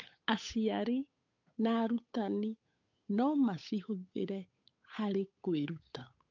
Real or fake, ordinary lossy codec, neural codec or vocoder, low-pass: fake; MP3, 64 kbps; codec, 16 kHz, 8 kbps, FunCodec, trained on Chinese and English, 25 frames a second; 7.2 kHz